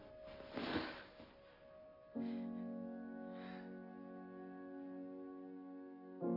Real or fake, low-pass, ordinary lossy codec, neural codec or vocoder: real; 5.4 kHz; AAC, 24 kbps; none